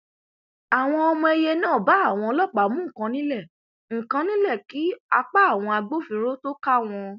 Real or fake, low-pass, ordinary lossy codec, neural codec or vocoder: real; 7.2 kHz; none; none